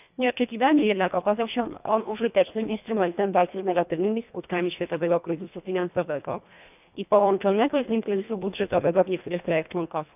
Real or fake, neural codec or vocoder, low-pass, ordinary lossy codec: fake; codec, 24 kHz, 1.5 kbps, HILCodec; 3.6 kHz; none